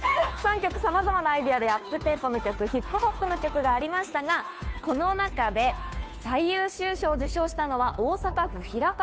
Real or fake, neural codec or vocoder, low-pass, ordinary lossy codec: fake; codec, 16 kHz, 2 kbps, FunCodec, trained on Chinese and English, 25 frames a second; none; none